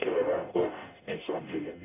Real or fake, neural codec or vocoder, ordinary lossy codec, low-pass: fake; codec, 44.1 kHz, 0.9 kbps, DAC; none; 3.6 kHz